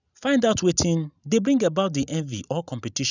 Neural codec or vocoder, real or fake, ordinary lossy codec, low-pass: none; real; none; 7.2 kHz